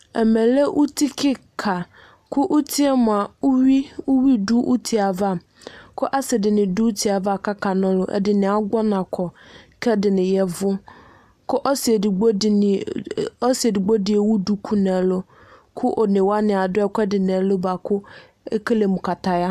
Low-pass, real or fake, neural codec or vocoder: 14.4 kHz; real; none